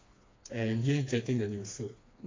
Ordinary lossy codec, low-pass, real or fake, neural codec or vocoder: AAC, 32 kbps; 7.2 kHz; fake; codec, 16 kHz, 2 kbps, FreqCodec, smaller model